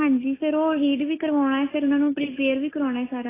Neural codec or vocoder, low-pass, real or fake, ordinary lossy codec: none; 3.6 kHz; real; AAC, 16 kbps